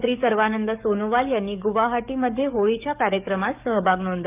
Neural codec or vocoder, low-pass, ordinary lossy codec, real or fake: codec, 44.1 kHz, 7.8 kbps, DAC; 3.6 kHz; none; fake